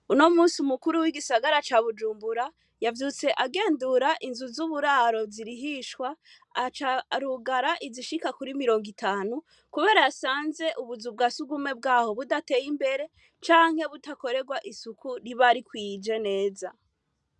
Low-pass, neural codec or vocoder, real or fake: 10.8 kHz; none; real